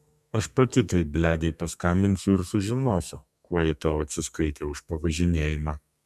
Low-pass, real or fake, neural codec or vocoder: 14.4 kHz; fake; codec, 32 kHz, 1.9 kbps, SNAC